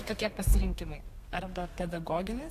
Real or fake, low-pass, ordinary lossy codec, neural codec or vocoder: fake; 14.4 kHz; AAC, 64 kbps; codec, 32 kHz, 1.9 kbps, SNAC